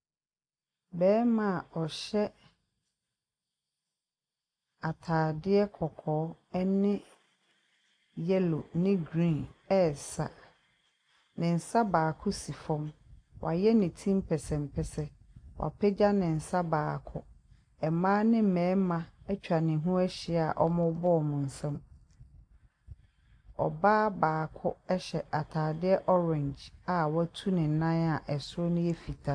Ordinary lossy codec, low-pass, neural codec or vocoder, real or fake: AAC, 64 kbps; 9.9 kHz; none; real